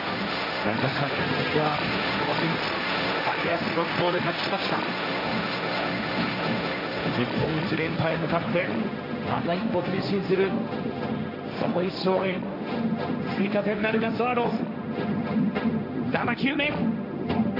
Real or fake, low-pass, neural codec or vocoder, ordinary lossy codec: fake; 5.4 kHz; codec, 16 kHz, 1.1 kbps, Voila-Tokenizer; AAC, 48 kbps